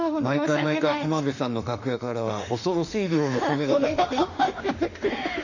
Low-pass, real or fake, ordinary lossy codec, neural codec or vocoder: 7.2 kHz; fake; none; autoencoder, 48 kHz, 32 numbers a frame, DAC-VAE, trained on Japanese speech